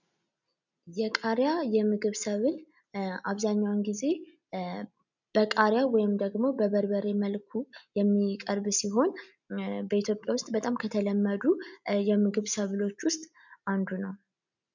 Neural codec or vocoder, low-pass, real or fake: none; 7.2 kHz; real